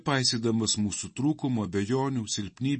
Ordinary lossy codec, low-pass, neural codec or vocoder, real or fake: MP3, 32 kbps; 10.8 kHz; none; real